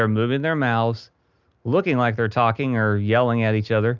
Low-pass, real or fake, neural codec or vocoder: 7.2 kHz; real; none